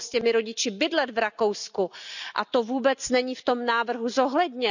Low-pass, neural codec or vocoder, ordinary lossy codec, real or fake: 7.2 kHz; none; none; real